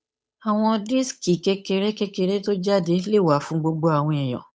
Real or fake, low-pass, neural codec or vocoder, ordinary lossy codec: fake; none; codec, 16 kHz, 8 kbps, FunCodec, trained on Chinese and English, 25 frames a second; none